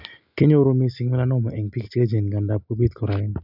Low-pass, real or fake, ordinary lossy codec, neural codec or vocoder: 5.4 kHz; real; none; none